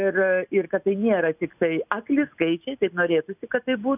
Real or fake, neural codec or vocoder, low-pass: real; none; 3.6 kHz